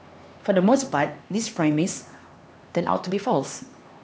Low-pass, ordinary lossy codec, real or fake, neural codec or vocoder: none; none; fake; codec, 16 kHz, 2 kbps, X-Codec, HuBERT features, trained on LibriSpeech